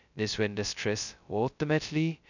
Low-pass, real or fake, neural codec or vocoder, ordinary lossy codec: 7.2 kHz; fake; codec, 16 kHz, 0.2 kbps, FocalCodec; none